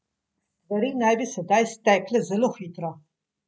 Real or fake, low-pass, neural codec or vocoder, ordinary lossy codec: real; none; none; none